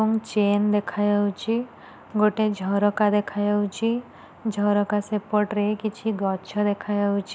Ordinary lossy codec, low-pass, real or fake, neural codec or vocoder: none; none; real; none